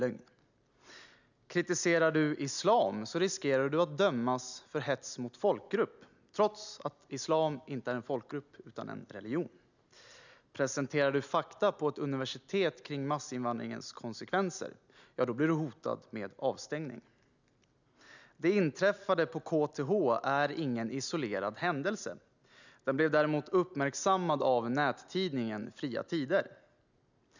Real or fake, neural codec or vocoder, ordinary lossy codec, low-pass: real; none; none; 7.2 kHz